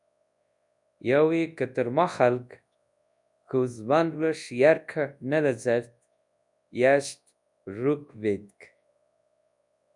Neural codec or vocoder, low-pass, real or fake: codec, 24 kHz, 0.9 kbps, WavTokenizer, large speech release; 10.8 kHz; fake